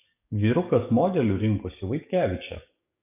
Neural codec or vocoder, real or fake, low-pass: codec, 44.1 kHz, 7.8 kbps, DAC; fake; 3.6 kHz